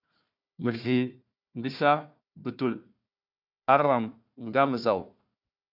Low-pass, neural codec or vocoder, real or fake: 5.4 kHz; codec, 16 kHz, 1 kbps, FunCodec, trained on Chinese and English, 50 frames a second; fake